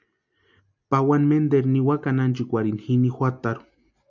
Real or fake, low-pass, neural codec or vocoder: real; 7.2 kHz; none